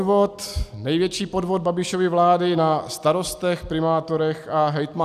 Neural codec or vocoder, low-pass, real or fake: none; 14.4 kHz; real